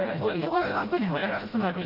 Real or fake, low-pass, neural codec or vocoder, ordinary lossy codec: fake; 5.4 kHz; codec, 16 kHz, 0.5 kbps, FreqCodec, smaller model; Opus, 24 kbps